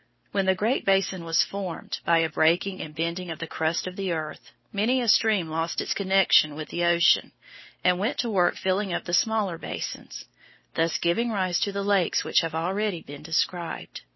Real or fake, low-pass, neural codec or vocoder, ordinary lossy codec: real; 7.2 kHz; none; MP3, 24 kbps